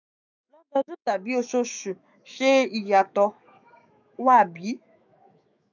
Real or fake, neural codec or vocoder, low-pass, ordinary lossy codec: fake; autoencoder, 48 kHz, 128 numbers a frame, DAC-VAE, trained on Japanese speech; 7.2 kHz; none